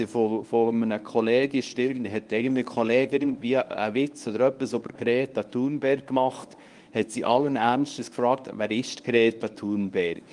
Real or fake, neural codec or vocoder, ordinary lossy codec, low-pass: fake; codec, 24 kHz, 0.9 kbps, WavTokenizer, medium speech release version 1; Opus, 32 kbps; 10.8 kHz